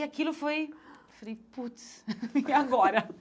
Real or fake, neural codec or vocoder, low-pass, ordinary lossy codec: real; none; none; none